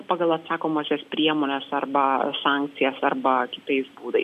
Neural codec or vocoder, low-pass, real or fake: none; 14.4 kHz; real